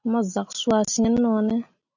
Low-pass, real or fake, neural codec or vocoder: 7.2 kHz; real; none